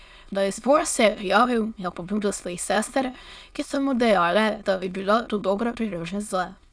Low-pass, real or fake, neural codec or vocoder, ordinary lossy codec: none; fake; autoencoder, 22.05 kHz, a latent of 192 numbers a frame, VITS, trained on many speakers; none